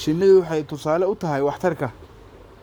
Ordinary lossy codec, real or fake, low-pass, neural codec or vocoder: none; fake; none; codec, 44.1 kHz, 7.8 kbps, Pupu-Codec